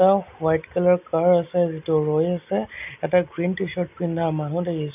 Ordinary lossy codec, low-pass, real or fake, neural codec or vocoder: none; 3.6 kHz; real; none